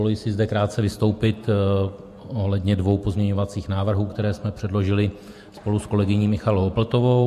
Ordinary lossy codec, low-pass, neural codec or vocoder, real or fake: MP3, 64 kbps; 14.4 kHz; vocoder, 48 kHz, 128 mel bands, Vocos; fake